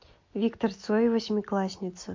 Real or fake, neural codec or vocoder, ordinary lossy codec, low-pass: real; none; MP3, 48 kbps; 7.2 kHz